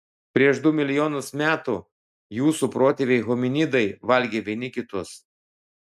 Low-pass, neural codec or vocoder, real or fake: 14.4 kHz; none; real